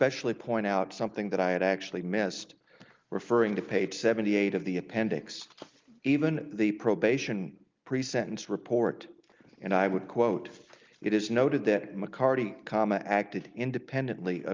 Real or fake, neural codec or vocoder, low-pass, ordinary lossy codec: real; none; 7.2 kHz; Opus, 24 kbps